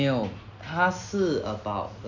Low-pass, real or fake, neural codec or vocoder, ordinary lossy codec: 7.2 kHz; real; none; none